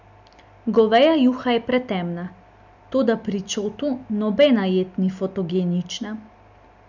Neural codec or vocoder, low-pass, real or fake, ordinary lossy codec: none; 7.2 kHz; real; none